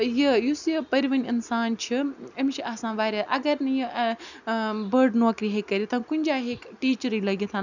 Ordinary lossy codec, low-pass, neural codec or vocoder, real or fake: none; 7.2 kHz; none; real